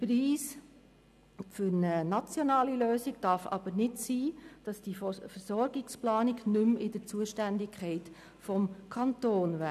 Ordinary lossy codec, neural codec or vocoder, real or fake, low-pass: none; none; real; 14.4 kHz